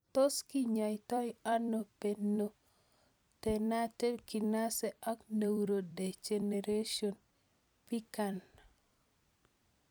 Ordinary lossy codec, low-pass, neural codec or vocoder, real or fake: none; none; vocoder, 44.1 kHz, 128 mel bands every 256 samples, BigVGAN v2; fake